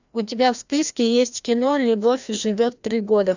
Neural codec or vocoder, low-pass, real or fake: codec, 16 kHz, 1 kbps, FreqCodec, larger model; 7.2 kHz; fake